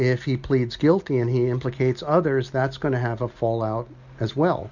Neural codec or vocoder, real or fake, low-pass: none; real; 7.2 kHz